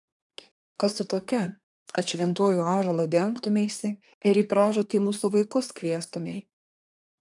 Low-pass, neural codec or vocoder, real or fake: 10.8 kHz; codec, 24 kHz, 1 kbps, SNAC; fake